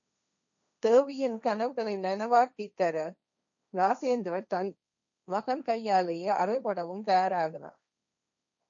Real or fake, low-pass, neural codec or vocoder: fake; 7.2 kHz; codec, 16 kHz, 1.1 kbps, Voila-Tokenizer